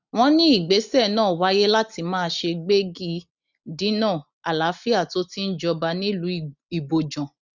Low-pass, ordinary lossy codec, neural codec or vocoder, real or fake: 7.2 kHz; none; none; real